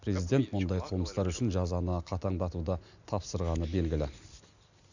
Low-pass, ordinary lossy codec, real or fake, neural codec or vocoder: 7.2 kHz; none; real; none